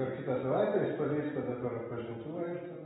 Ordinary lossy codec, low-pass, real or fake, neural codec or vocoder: AAC, 16 kbps; 10.8 kHz; real; none